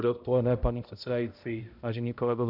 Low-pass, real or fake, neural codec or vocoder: 5.4 kHz; fake; codec, 16 kHz, 0.5 kbps, X-Codec, HuBERT features, trained on balanced general audio